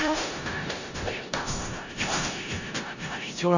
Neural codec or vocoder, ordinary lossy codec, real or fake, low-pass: codec, 16 kHz in and 24 kHz out, 0.4 kbps, LongCat-Audio-Codec, four codebook decoder; none; fake; 7.2 kHz